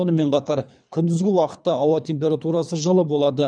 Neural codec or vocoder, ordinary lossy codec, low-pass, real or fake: codec, 24 kHz, 3 kbps, HILCodec; none; 9.9 kHz; fake